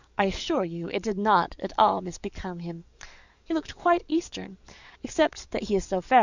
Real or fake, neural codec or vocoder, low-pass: fake; codec, 44.1 kHz, 7.8 kbps, DAC; 7.2 kHz